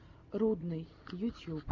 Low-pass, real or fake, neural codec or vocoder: 7.2 kHz; real; none